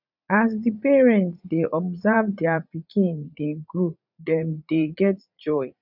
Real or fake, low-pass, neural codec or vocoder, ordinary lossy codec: fake; 5.4 kHz; vocoder, 44.1 kHz, 80 mel bands, Vocos; none